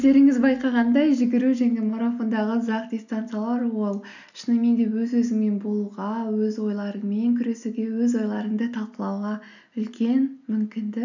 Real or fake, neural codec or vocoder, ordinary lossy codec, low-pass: real; none; none; 7.2 kHz